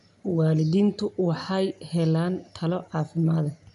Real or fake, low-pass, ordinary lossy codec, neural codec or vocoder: real; 10.8 kHz; none; none